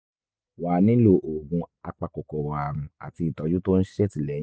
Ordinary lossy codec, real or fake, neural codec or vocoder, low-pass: none; real; none; none